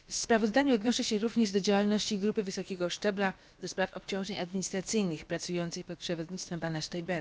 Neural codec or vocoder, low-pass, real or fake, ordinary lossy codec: codec, 16 kHz, about 1 kbps, DyCAST, with the encoder's durations; none; fake; none